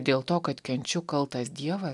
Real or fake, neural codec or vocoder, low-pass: real; none; 10.8 kHz